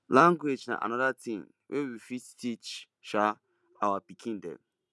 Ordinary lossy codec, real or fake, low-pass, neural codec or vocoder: none; real; none; none